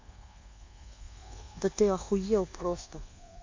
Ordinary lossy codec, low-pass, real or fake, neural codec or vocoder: MP3, 48 kbps; 7.2 kHz; fake; codec, 24 kHz, 1.2 kbps, DualCodec